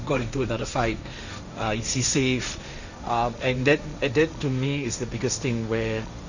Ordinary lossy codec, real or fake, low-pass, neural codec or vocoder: none; fake; 7.2 kHz; codec, 16 kHz, 1.1 kbps, Voila-Tokenizer